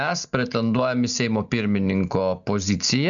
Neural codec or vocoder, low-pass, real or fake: none; 7.2 kHz; real